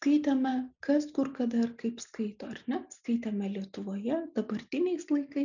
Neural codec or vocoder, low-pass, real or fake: none; 7.2 kHz; real